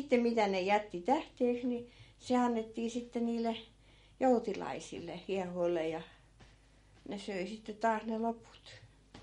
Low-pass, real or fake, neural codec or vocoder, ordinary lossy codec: 19.8 kHz; real; none; MP3, 48 kbps